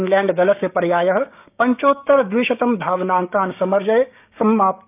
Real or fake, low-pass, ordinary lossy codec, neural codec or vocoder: fake; 3.6 kHz; none; codec, 16 kHz, 6 kbps, DAC